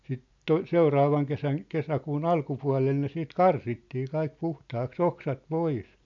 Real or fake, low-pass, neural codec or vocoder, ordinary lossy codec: real; 7.2 kHz; none; AAC, 64 kbps